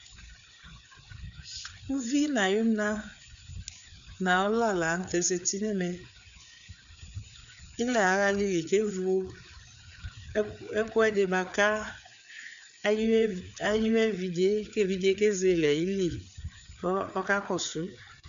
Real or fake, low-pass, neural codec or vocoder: fake; 7.2 kHz; codec, 16 kHz, 4 kbps, FreqCodec, larger model